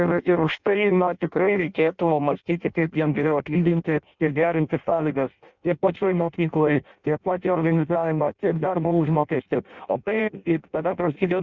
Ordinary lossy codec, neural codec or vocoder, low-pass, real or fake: Opus, 64 kbps; codec, 16 kHz in and 24 kHz out, 0.6 kbps, FireRedTTS-2 codec; 7.2 kHz; fake